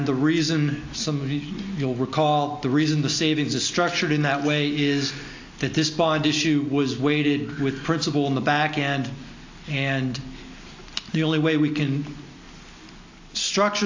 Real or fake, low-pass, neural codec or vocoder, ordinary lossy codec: real; 7.2 kHz; none; AAC, 48 kbps